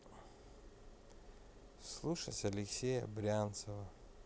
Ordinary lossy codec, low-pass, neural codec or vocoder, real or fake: none; none; none; real